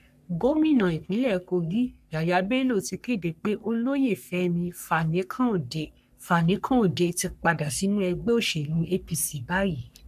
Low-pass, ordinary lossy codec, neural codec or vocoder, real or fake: 14.4 kHz; none; codec, 44.1 kHz, 3.4 kbps, Pupu-Codec; fake